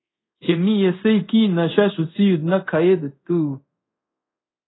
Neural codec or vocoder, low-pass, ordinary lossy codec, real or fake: codec, 24 kHz, 0.5 kbps, DualCodec; 7.2 kHz; AAC, 16 kbps; fake